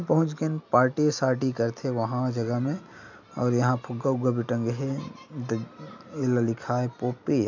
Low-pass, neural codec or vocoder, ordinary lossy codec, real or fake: 7.2 kHz; none; none; real